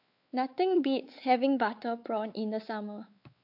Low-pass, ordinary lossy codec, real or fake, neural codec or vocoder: 5.4 kHz; AAC, 48 kbps; fake; codec, 16 kHz, 4 kbps, X-Codec, WavLM features, trained on Multilingual LibriSpeech